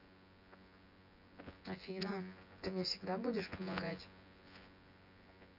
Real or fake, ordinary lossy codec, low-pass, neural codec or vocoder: fake; none; 5.4 kHz; vocoder, 24 kHz, 100 mel bands, Vocos